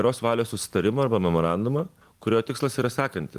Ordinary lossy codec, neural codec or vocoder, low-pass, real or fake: Opus, 24 kbps; none; 14.4 kHz; real